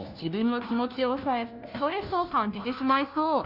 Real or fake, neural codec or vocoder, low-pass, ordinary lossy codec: fake; codec, 16 kHz, 1 kbps, FunCodec, trained on LibriTTS, 50 frames a second; 5.4 kHz; none